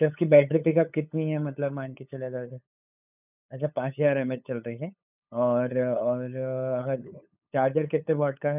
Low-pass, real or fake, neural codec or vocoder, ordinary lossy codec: 3.6 kHz; fake; codec, 16 kHz, 8 kbps, FunCodec, trained on LibriTTS, 25 frames a second; none